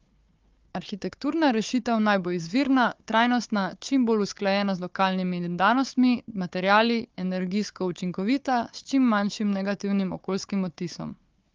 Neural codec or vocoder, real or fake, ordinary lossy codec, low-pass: codec, 16 kHz, 4 kbps, FunCodec, trained on Chinese and English, 50 frames a second; fake; Opus, 32 kbps; 7.2 kHz